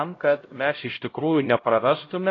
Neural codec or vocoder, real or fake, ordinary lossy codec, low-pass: codec, 16 kHz, 0.5 kbps, X-Codec, HuBERT features, trained on LibriSpeech; fake; AAC, 32 kbps; 7.2 kHz